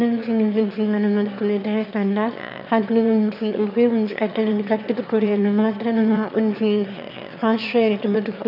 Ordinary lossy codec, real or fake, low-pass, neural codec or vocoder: none; fake; 5.4 kHz; autoencoder, 22.05 kHz, a latent of 192 numbers a frame, VITS, trained on one speaker